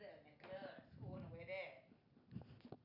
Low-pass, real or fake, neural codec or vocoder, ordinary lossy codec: 5.4 kHz; real; none; none